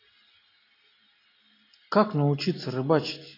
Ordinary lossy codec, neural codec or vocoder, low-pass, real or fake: AAC, 24 kbps; none; 5.4 kHz; real